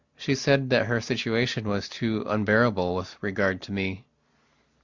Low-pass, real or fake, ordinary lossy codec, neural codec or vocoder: 7.2 kHz; real; Opus, 64 kbps; none